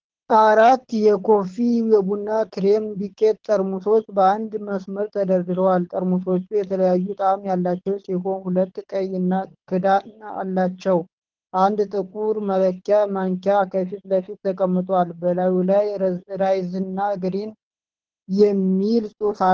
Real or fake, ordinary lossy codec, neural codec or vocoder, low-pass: fake; Opus, 16 kbps; codec, 24 kHz, 6 kbps, HILCodec; 7.2 kHz